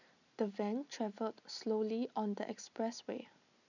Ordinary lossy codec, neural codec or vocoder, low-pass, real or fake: none; none; 7.2 kHz; real